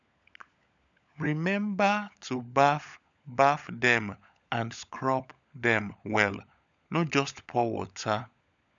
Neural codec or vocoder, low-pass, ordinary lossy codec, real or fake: codec, 16 kHz, 16 kbps, FunCodec, trained on LibriTTS, 50 frames a second; 7.2 kHz; none; fake